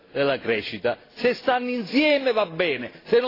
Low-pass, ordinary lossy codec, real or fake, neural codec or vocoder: 5.4 kHz; AAC, 24 kbps; real; none